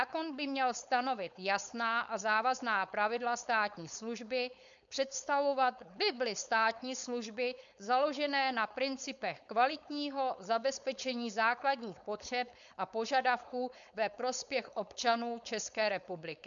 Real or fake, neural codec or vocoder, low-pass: fake; codec, 16 kHz, 4.8 kbps, FACodec; 7.2 kHz